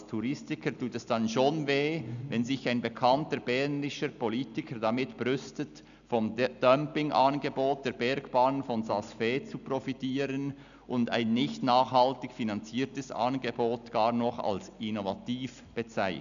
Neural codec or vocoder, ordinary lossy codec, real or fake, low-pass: none; none; real; 7.2 kHz